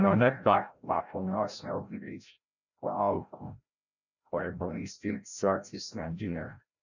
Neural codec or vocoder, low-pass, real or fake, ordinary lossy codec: codec, 16 kHz, 0.5 kbps, FreqCodec, larger model; 7.2 kHz; fake; none